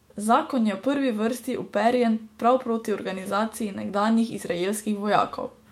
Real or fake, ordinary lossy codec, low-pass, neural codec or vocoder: fake; MP3, 64 kbps; 19.8 kHz; autoencoder, 48 kHz, 128 numbers a frame, DAC-VAE, trained on Japanese speech